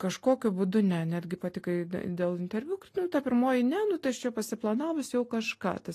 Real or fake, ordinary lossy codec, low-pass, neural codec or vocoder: real; AAC, 48 kbps; 14.4 kHz; none